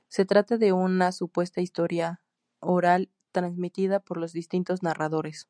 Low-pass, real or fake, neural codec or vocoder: 9.9 kHz; real; none